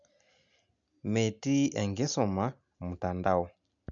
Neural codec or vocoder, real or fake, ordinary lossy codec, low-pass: none; real; none; 7.2 kHz